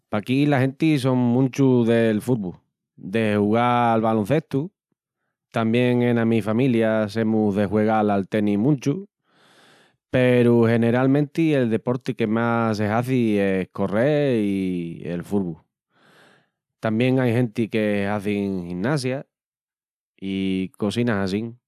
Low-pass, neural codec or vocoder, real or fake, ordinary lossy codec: 14.4 kHz; none; real; none